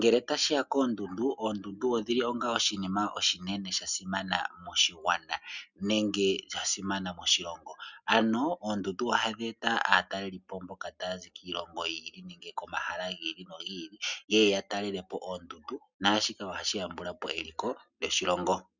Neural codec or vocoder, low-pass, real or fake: none; 7.2 kHz; real